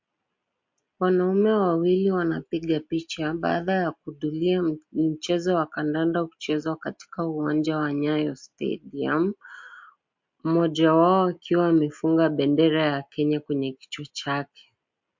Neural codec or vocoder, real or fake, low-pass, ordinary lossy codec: none; real; 7.2 kHz; MP3, 48 kbps